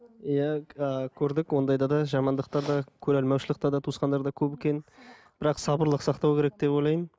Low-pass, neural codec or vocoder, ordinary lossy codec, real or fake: none; none; none; real